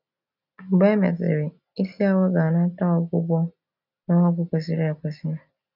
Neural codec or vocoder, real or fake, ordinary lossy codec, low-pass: none; real; none; 5.4 kHz